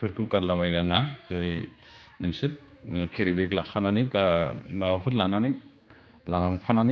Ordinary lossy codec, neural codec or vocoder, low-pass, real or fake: none; codec, 16 kHz, 2 kbps, X-Codec, HuBERT features, trained on general audio; none; fake